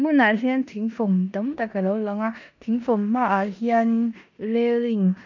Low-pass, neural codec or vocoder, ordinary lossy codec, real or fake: 7.2 kHz; codec, 16 kHz in and 24 kHz out, 0.9 kbps, LongCat-Audio-Codec, four codebook decoder; none; fake